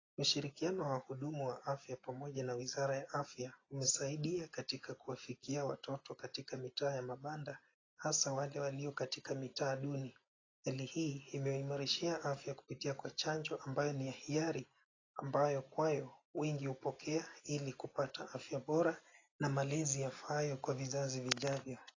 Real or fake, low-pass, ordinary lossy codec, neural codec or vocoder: real; 7.2 kHz; AAC, 32 kbps; none